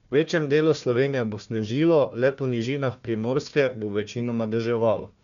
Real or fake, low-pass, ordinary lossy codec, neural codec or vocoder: fake; 7.2 kHz; none; codec, 16 kHz, 1 kbps, FunCodec, trained on Chinese and English, 50 frames a second